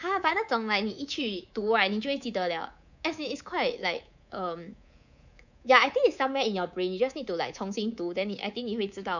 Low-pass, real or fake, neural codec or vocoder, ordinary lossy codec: 7.2 kHz; fake; codec, 24 kHz, 3.1 kbps, DualCodec; none